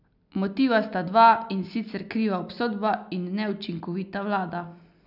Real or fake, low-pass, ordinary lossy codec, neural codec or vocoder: real; 5.4 kHz; none; none